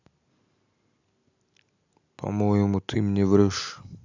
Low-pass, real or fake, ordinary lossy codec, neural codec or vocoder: 7.2 kHz; real; none; none